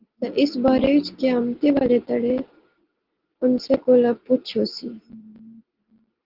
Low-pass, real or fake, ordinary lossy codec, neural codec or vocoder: 5.4 kHz; real; Opus, 16 kbps; none